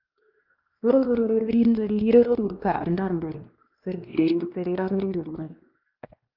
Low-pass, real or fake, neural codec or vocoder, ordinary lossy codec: 5.4 kHz; fake; codec, 16 kHz, 1 kbps, X-Codec, WavLM features, trained on Multilingual LibriSpeech; Opus, 24 kbps